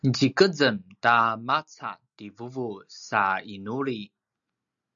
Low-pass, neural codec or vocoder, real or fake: 7.2 kHz; none; real